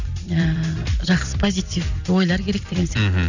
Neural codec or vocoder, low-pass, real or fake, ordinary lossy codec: vocoder, 44.1 kHz, 128 mel bands every 256 samples, BigVGAN v2; 7.2 kHz; fake; none